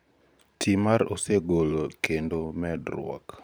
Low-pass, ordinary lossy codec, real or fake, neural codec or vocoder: none; none; fake; vocoder, 44.1 kHz, 128 mel bands every 512 samples, BigVGAN v2